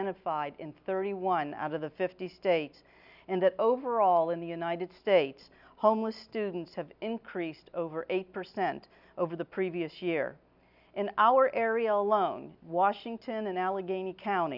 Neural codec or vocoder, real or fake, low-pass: none; real; 5.4 kHz